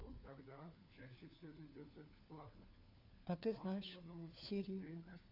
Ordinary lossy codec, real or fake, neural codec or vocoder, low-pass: none; fake; codec, 16 kHz, 4 kbps, FunCodec, trained on LibriTTS, 50 frames a second; 5.4 kHz